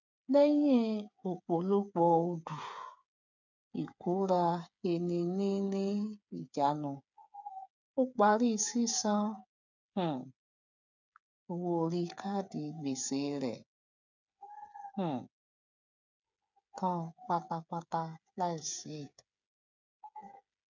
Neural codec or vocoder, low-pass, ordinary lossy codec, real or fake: codec, 16 kHz, 8 kbps, FreqCodec, smaller model; 7.2 kHz; none; fake